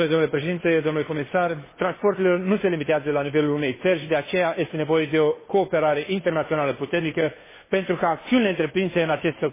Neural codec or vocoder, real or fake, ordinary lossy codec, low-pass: codec, 16 kHz, 2 kbps, FunCodec, trained on Chinese and English, 25 frames a second; fake; MP3, 16 kbps; 3.6 kHz